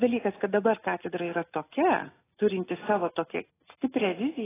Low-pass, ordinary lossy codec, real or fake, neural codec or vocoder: 3.6 kHz; AAC, 16 kbps; real; none